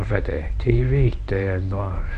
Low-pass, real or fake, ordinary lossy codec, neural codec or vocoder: 10.8 kHz; fake; none; codec, 24 kHz, 0.9 kbps, WavTokenizer, medium speech release version 1